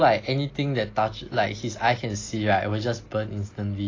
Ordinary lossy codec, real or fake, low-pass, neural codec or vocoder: AAC, 32 kbps; real; 7.2 kHz; none